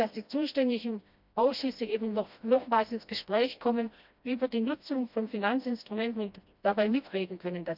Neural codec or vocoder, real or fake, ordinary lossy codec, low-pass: codec, 16 kHz, 1 kbps, FreqCodec, smaller model; fake; none; 5.4 kHz